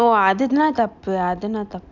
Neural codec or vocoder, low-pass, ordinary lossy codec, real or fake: none; 7.2 kHz; none; real